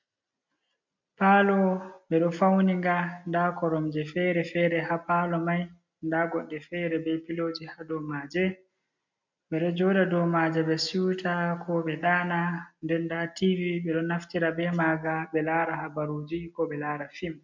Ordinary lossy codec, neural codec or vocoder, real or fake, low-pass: MP3, 64 kbps; none; real; 7.2 kHz